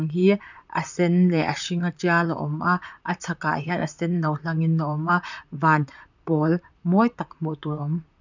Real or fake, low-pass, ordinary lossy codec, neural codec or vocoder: fake; 7.2 kHz; none; vocoder, 44.1 kHz, 128 mel bands, Pupu-Vocoder